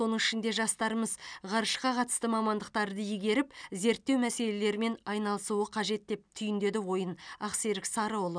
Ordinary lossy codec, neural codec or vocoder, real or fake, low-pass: none; none; real; 9.9 kHz